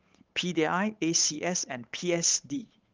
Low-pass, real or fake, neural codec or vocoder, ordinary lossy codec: 7.2 kHz; fake; codec, 16 kHz, 8 kbps, FunCodec, trained on Chinese and English, 25 frames a second; Opus, 24 kbps